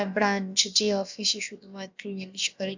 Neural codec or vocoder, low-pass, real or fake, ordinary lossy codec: codec, 16 kHz, about 1 kbps, DyCAST, with the encoder's durations; 7.2 kHz; fake; MP3, 64 kbps